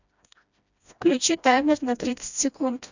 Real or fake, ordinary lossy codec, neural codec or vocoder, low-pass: fake; none; codec, 16 kHz, 1 kbps, FreqCodec, smaller model; 7.2 kHz